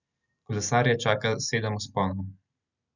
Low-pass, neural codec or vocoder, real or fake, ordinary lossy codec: 7.2 kHz; none; real; none